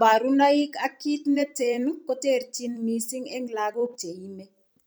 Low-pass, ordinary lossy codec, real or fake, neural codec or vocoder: none; none; fake; vocoder, 44.1 kHz, 128 mel bands every 256 samples, BigVGAN v2